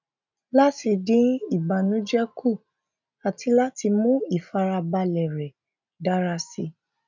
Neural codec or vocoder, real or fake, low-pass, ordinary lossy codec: none; real; 7.2 kHz; none